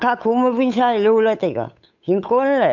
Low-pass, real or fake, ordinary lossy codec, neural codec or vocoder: 7.2 kHz; fake; none; codec, 16 kHz, 8 kbps, FunCodec, trained on Chinese and English, 25 frames a second